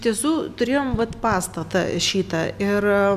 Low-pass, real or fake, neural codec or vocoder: 14.4 kHz; real; none